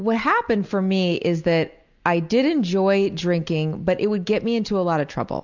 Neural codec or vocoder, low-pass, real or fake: none; 7.2 kHz; real